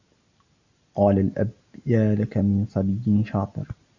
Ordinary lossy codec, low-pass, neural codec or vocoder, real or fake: AAC, 48 kbps; 7.2 kHz; none; real